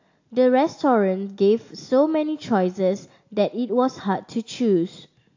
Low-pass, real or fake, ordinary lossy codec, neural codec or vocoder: 7.2 kHz; real; AAC, 48 kbps; none